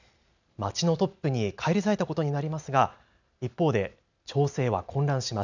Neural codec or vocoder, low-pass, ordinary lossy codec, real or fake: none; 7.2 kHz; none; real